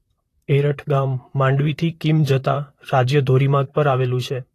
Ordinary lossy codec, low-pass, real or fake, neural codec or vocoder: AAC, 48 kbps; 14.4 kHz; fake; vocoder, 44.1 kHz, 128 mel bands, Pupu-Vocoder